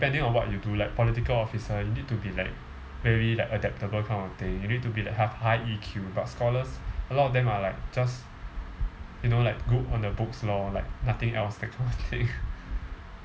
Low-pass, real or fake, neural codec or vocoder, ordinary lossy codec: none; real; none; none